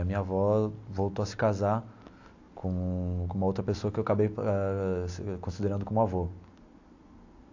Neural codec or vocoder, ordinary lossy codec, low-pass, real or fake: none; AAC, 48 kbps; 7.2 kHz; real